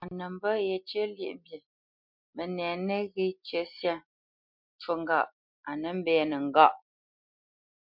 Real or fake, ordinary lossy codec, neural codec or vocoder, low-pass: real; MP3, 48 kbps; none; 5.4 kHz